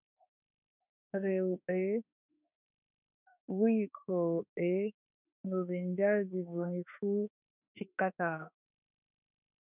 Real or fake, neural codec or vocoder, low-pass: fake; autoencoder, 48 kHz, 32 numbers a frame, DAC-VAE, trained on Japanese speech; 3.6 kHz